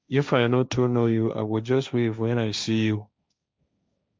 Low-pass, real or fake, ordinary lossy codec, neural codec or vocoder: none; fake; none; codec, 16 kHz, 1.1 kbps, Voila-Tokenizer